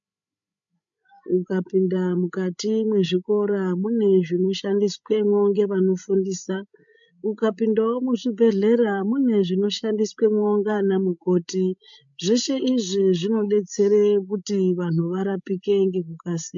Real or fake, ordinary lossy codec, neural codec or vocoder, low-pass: fake; MP3, 48 kbps; codec, 16 kHz, 16 kbps, FreqCodec, larger model; 7.2 kHz